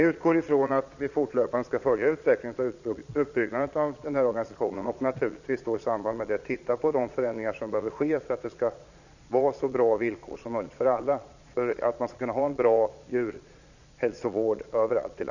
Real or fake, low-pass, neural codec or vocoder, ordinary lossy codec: fake; 7.2 kHz; vocoder, 22.05 kHz, 80 mel bands, Vocos; none